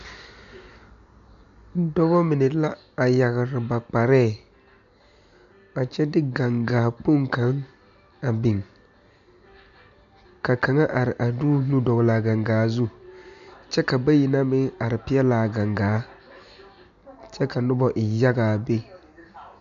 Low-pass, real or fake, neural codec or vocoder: 7.2 kHz; real; none